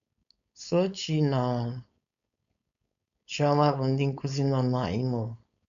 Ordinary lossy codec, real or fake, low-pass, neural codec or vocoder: none; fake; 7.2 kHz; codec, 16 kHz, 4.8 kbps, FACodec